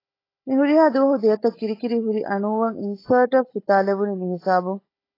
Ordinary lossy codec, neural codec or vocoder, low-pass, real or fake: AAC, 24 kbps; codec, 16 kHz, 16 kbps, FunCodec, trained on Chinese and English, 50 frames a second; 5.4 kHz; fake